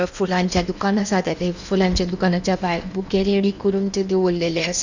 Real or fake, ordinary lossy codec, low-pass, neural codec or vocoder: fake; none; 7.2 kHz; codec, 16 kHz in and 24 kHz out, 0.8 kbps, FocalCodec, streaming, 65536 codes